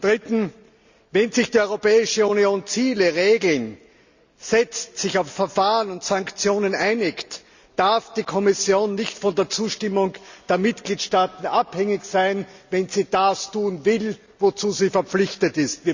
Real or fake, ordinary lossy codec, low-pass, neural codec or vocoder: real; Opus, 64 kbps; 7.2 kHz; none